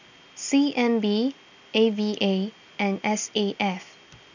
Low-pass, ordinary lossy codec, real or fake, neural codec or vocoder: 7.2 kHz; none; real; none